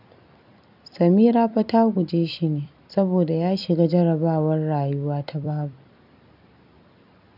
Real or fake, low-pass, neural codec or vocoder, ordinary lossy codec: fake; 5.4 kHz; vocoder, 44.1 kHz, 128 mel bands every 256 samples, BigVGAN v2; none